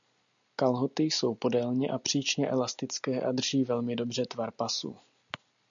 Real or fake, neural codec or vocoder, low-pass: real; none; 7.2 kHz